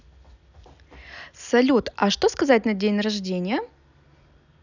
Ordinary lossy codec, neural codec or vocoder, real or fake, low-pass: none; none; real; 7.2 kHz